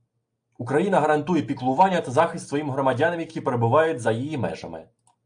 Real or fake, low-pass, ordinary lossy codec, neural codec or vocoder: real; 9.9 kHz; AAC, 64 kbps; none